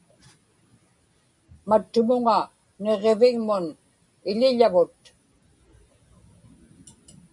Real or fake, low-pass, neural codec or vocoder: real; 10.8 kHz; none